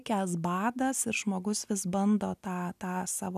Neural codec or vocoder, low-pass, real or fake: none; 14.4 kHz; real